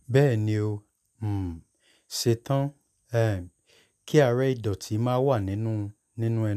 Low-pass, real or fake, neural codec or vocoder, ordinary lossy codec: 14.4 kHz; real; none; none